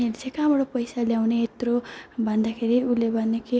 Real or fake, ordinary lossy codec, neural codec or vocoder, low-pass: real; none; none; none